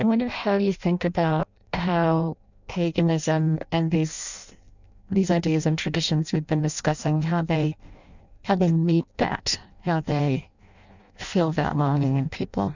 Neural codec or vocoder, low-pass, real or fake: codec, 16 kHz in and 24 kHz out, 0.6 kbps, FireRedTTS-2 codec; 7.2 kHz; fake